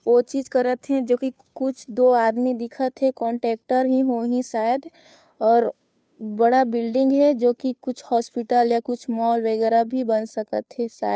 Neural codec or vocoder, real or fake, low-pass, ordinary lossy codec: codec, 16 kHz, 2 kbps, FunCodec, trained on Chinese and English, 25 frames a second; fake; none; none